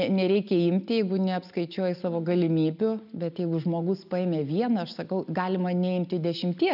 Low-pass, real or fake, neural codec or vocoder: 5.4 kHz; real; none